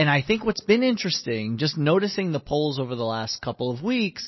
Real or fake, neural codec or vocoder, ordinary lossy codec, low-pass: real; none; MP3, 24 kbps; 7.2 kHz